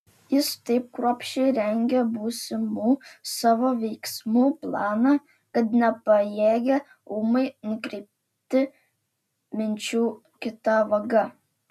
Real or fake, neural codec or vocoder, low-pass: real; none; 14.4 kHz